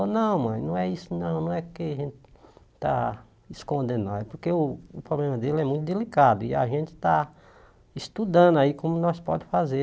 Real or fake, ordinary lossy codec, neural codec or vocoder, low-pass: real; none; none; none